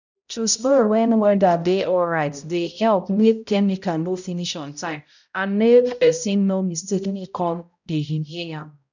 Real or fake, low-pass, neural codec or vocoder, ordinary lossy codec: fake; 7.2 kHz; codec, 16 kHz, 0.5 kbps, X-Codec, HuBERT features, trained on balanced general audio; none